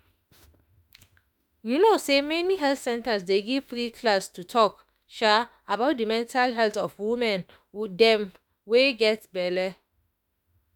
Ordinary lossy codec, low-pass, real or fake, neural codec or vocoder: none; none; fake; autoencoder, 48 kHz, 32 numbers a frame, DAC-VAE, trained on Japanese speech